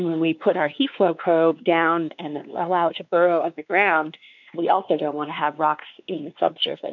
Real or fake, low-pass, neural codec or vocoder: fake; 7.2 kHz; codec, 16 kHz, 2 kbps, X-Codec, WavLM features, trained on Multilingual LibriSpeech